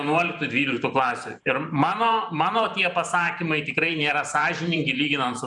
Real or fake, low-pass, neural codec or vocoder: fake; 10.8 kHz; vocoder, 44.1 kHz, 128 mel bands every 512 samples, BigVGAN v2